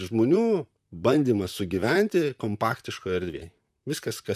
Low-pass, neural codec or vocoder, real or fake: 14.4 kHz; vocoder, 44.1 kHz, 128 mel bands, Pupu-Vocoder; fake